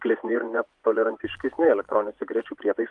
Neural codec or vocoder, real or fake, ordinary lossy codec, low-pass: vocoder, 24 kHz, 100 mel bands, Vocos; fake; Opus, 32 kbps; 10.8 kHz